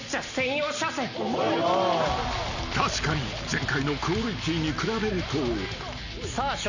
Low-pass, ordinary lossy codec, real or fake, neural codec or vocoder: 7.2 kHz; none; real; none